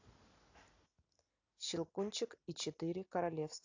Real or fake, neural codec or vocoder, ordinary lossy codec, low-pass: real; none; AAC, 48 kbps; 7.2 kHz